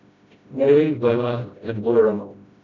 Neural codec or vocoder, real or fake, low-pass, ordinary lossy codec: codec, 16 kHz, 0.5 kbps, FreqCodec, smaller model; fake; 7.2 kHz; none